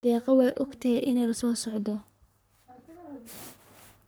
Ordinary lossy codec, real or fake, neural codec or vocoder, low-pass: none; fake; codec, 44.1 kHz, 3.4 kbps, Pupu-Codec; none